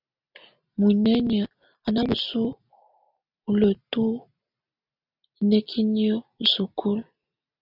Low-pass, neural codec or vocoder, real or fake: 5.4 kHz; none; real